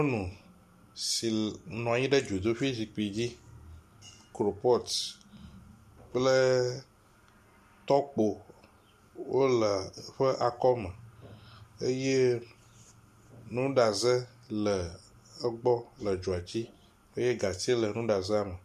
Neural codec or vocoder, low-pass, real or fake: none; 14.4 kHz; real